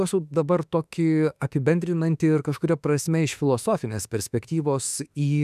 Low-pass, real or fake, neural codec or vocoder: 14.4 kHz; fake; autoencoder, 48 kHz, 32 numbers a frame, DAC-VAE, trained on Japanese speech